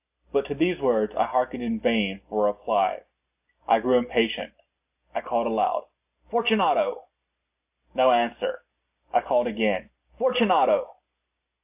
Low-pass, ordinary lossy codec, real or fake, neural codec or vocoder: 3.6 kHz; AAC, 32 kbps; real; none